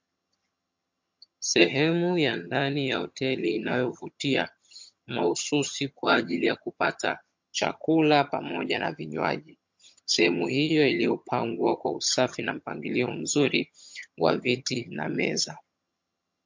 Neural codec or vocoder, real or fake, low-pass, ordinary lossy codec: vocoder, 22.05 kHz, 80 mel bands, HiFi-GAN; fake; 7.2 kHz; MP3, 48 kbps